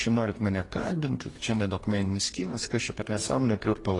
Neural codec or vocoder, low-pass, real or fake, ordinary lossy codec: codec, 44.1 kHz, 2.6 kbps, DAC; 10.8 kHz; fake; AAC, 32 kbps